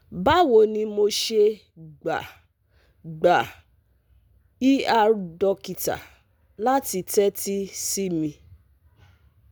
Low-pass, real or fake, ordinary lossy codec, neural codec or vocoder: none; real; none; none